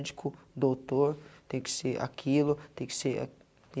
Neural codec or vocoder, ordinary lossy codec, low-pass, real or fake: none; none; none; real